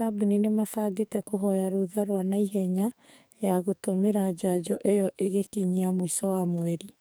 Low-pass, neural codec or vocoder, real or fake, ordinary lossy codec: none; codec, 44.1 kHz, 2.6 kbps, SNAC; fake; none